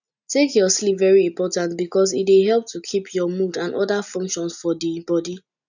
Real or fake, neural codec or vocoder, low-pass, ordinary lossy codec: real; none; 7.2 kHz; none